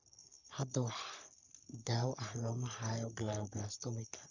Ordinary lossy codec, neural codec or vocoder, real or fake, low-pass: none; codec, 44.1 kHz, 3.4 kbps, Pupu-Codec; fake; 7.2 kHz